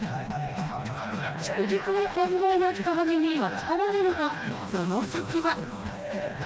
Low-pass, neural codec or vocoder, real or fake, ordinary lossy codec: none; codec, 16 kHz, 1 kbps, FreqCodec, smaller model; fake; none